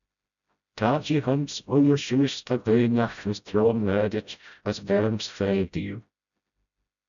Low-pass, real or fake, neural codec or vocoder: 7.2 kHz; fake; codec, 16 kHz, 0.5 kbps, FreqCodec, smaller model